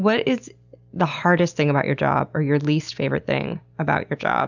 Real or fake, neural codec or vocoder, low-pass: real; none; 7.2 kHz